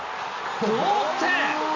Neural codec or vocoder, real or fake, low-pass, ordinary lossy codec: none; real; 7.2 kHz; MP3, 48 kbps